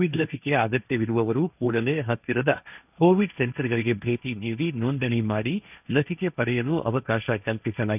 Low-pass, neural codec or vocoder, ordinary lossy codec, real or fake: 3.6 kHz; codec, 16 kHz, 1.1 kbps, Voila-Tokenizer; none; fake